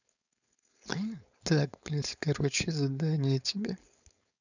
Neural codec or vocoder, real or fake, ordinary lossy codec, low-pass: codec, 16 kHz, 4.8 kbps, FACodec; fake; none; 7.2 kHz